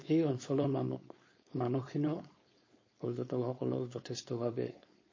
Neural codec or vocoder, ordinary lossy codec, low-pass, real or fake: codec, 16 kHz, 4.8 kbps, FACodec; MP3, 32 kbps; 7.2 kHz; fake